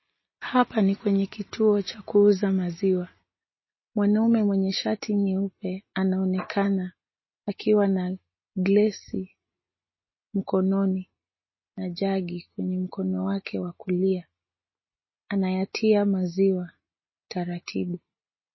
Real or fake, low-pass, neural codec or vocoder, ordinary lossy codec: real; 7.2 kHz; none; MP3, 24 kbps